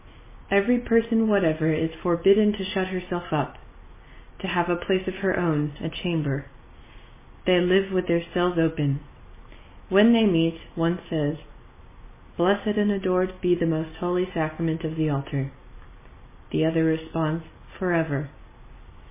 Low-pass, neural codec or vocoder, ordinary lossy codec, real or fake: 3.6 kHz; none; MP3, 16 kbps; real